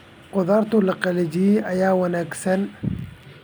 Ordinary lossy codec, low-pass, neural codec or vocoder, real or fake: none; none; none; real